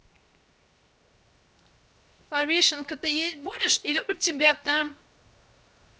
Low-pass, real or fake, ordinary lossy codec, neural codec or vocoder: none; fake; none; codec, 16 kHz, 0.7 kbps, FocalCodec